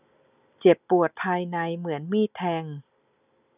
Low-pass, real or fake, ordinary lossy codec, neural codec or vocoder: 3.6 kHz; real; none; none